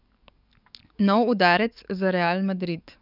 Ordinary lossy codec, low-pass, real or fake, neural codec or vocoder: none; 5.4 kHz; fake; codec, 44.1 kHz, 7.8 kbps, DAC